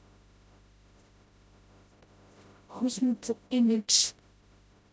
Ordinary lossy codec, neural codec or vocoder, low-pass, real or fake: none; codec, 16 kHz, 0.5 kbps, FreqCodec, smaller model; none; fake